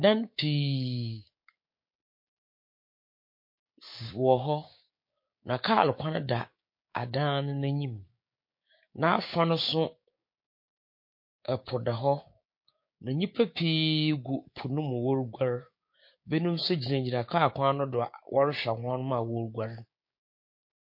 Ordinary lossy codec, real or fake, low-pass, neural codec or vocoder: MP3, 32 kbps; fake; 5.4 kHz; codec, 16 kHz, 6 kbps, DAC